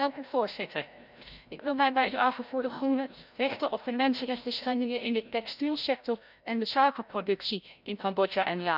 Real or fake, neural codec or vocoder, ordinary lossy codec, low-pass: fake; codec, 16 kHz, 0.5 kbps, FreqCodec, larger model; none; 5.4 kHz